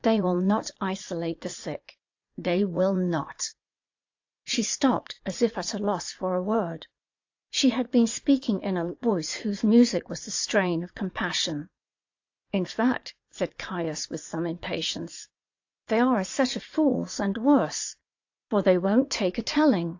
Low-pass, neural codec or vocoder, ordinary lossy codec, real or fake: 7.2 kHz; vocoder, 44.1 kHz, 80 mel bands, Vocos; AAC, 48 kbps; fake